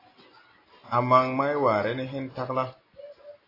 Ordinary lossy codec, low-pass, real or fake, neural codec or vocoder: AAC, 24 kbps; 5.4 kHz; real; none